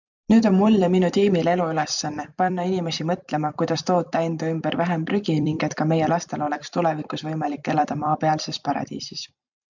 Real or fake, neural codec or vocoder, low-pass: fake; vocoder, 44.1 kHz, 128 mel bands every 256 samples, BigVGAN v2; 7.2 kHz